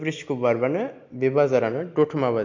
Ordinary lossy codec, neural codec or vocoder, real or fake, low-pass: AAC, 48 kbps; none; real; 7.2 kHz